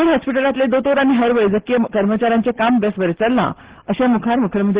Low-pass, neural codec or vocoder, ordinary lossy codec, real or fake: 3.6 kHz; none; Opus, 16 kbps; real